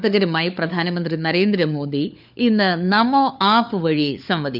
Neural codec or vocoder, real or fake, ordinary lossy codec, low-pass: codec, 16 kHz, 4 kbps, FunCodec, trained on LibriTTS, 50 frames a second; fake; none; 5.4 kHz